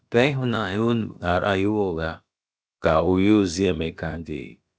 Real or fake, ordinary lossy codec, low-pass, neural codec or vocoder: fake; none; none; codec, 16 kHz, about 1 kbps, DyCAST, with the encoder's durations